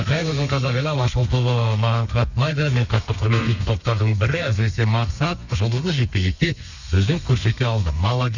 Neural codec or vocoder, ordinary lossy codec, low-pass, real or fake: codec, 32 kHz, 1.9 kbps, SNAC; none; 7.2 kHz; fake